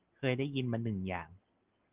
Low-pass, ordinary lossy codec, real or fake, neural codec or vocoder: 3.6 kHz; Opus, 32 kbps; real; none